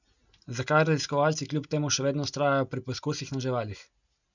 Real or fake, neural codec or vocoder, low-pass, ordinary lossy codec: real; none; 7.2 kHz; none